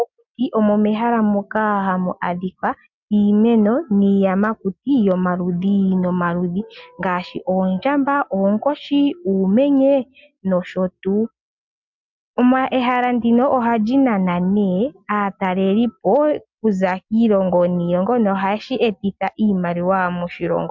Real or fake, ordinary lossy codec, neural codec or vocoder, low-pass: real; MP3, 64 kbps; none; 7.2 kHz